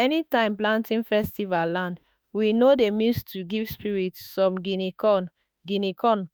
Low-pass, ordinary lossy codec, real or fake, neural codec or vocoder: none; none; fake; autoencoder, 48 kHz, 32 numbers a frame, DAC-VAE, trained on Japanese speech